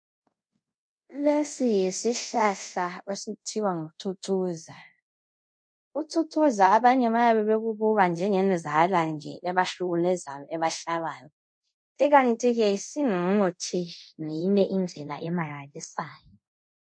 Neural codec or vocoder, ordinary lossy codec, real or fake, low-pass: codec, 24 kHz, 0.5 kbps, DualCodec; MP3, 48 kbps; fake; 9.9 kHz